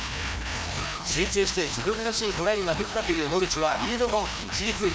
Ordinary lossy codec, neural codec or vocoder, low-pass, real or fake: none; codec, 16 kHz, 1 kbps, FreqCodec, larger model; none; fake